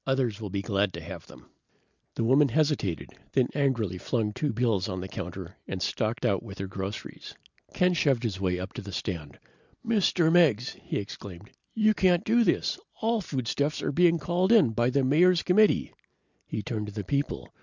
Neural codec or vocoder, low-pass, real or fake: none; 7.2 kHz; real